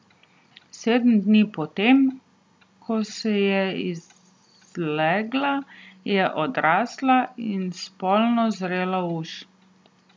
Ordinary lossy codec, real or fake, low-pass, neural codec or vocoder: none; real; none; none